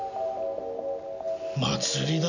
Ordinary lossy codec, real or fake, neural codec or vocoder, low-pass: none; real; none; 7.2 kHz